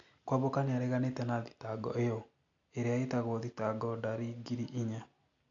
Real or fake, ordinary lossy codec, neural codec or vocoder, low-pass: real; AAC, 64 kbps; none; 7.2 kHz